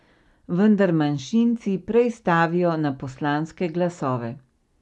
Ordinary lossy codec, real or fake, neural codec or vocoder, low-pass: none; real; none; none